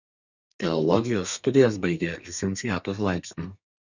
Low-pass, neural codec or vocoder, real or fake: 7.2 kHz; codec, 32 kHz, 1.9 kbps, SNAC; fake